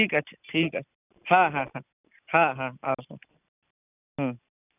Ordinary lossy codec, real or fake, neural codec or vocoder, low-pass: none; real; none; 3.6 kHz